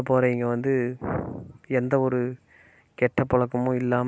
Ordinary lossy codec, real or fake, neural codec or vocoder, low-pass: none; real; none; none